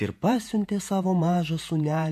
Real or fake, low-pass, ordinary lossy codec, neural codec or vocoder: fake; 14.4 kHz; MP3, 64 kbps; vocoder, 48 kHz, 128 mel bands, Vocos